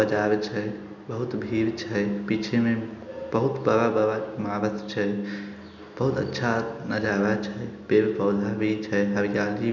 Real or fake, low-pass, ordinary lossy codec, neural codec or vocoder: real; 7.2 kHz; none; none